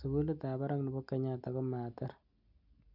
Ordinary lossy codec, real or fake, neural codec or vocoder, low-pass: none; real; none; 5.4 kHz